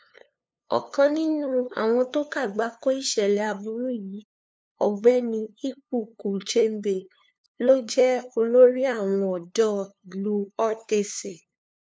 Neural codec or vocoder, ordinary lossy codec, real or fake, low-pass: codec, 16 kHz, 2 kbps, FunCodec, trained on LibriTTS, 25 frames a second; none; fake; none